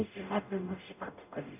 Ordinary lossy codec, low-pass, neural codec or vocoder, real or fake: none; 3.6 kHz; codec, 44.1 kHz, 0.9 kbps, DAC; fake